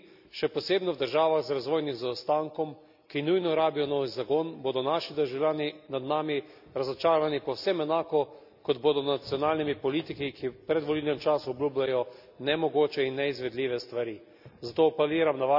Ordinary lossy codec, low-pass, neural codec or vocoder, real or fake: none; 5.4 kHz; none; real